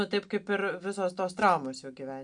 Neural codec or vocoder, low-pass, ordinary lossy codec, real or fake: none; 9.9 kHz; MP3, 64 kbps; real